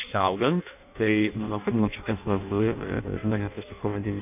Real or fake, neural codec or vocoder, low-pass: fake; codec, 16 kHz in and 24 kHz out, 0.6 kbps, FireRedTTS-2 codec; 3.6 kHz